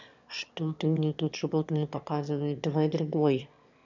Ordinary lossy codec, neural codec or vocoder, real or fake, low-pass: none; autoencoder, 22.05 kHz, a latent of 192 numbers a frame, VITS, trained on one speaker; fake; 7.2 kHz